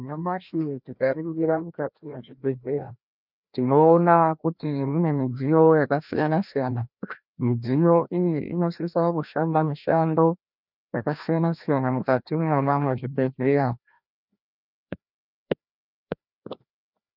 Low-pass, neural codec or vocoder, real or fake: 5.4 kHz; codec, 16 kHz, 1 kbps, FreqCodec, larger model; fake